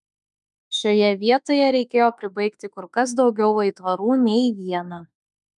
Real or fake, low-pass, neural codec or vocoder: fake; 10.8 kHz; autoencoder, 48 kHz, 32 numbers a frame, DAC-VAE, trained on Japanese speech